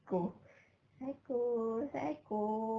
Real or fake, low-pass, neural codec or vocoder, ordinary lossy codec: real; 7.2 kHz; none; Opus, 32 kbps